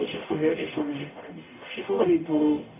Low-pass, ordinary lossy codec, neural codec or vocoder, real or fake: 3.6 kHz; none; codec, 44.1 kHz, 0.9 kbps, DAC; fake